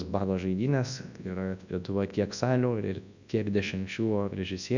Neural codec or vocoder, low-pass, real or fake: codec, 24 kHz, 0.9 kbps, WavTokenizer, large speech release; 7.2 kHz; fake